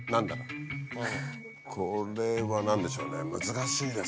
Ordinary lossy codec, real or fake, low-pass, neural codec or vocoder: none; real; none; none